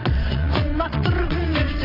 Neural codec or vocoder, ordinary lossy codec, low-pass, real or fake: vocoder, 22.05 kHz, 80 mel bands, WaveNeXt; AAC, 24 kbps; 5.4 kHz; fake